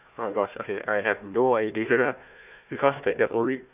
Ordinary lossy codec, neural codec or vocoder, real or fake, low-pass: none; codec, 16 kHz, 1 kbps, FunCodec, trained on Chinese and English, 50 frames a second; fake; 3.6 kHz